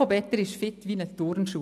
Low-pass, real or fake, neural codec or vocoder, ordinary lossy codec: 14.4 kHz; real; none; none